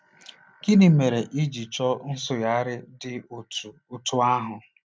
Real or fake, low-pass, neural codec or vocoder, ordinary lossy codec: real; none; none; none